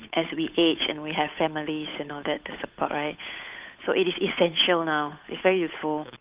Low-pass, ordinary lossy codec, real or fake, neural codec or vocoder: 3.6 kHz; Opus, 24 kbps; fake; codec, 16 kHz, 8 kbps, FunCodec, trained on Chinese and English, 25 frames a second